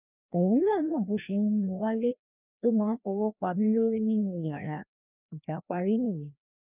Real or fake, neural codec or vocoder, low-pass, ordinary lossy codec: fake; codec, 16 kHz, 1 kbps, FreqCodec, larger model; 3.6 kHz; none